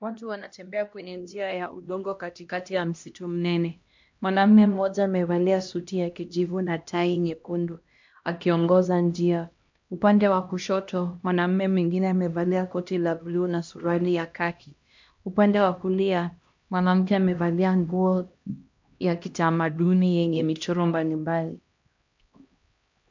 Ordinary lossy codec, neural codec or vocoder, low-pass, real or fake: MP3, 48 kbps; codec, 16 kHz, 1 kbps, X-Codec, HuBERT features, trained on LibriSpeech; 7.2 kHz; fake